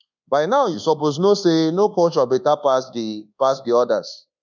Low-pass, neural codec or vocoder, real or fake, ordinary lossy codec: 7.2 kHz; codec, 24 kHz, 1.2 kbps, DualCodec; fake; none